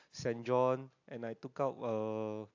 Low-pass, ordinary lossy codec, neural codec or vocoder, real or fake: 7.2 kHz; AAC, 48 kbps; none; real